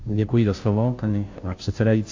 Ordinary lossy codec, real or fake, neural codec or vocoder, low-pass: AAC, 48 kbps; fake; codec, 16 kHz, 0.5 kbps, FunCodec, trained on Chinese and English, 25 frames a second; 7.2 kHz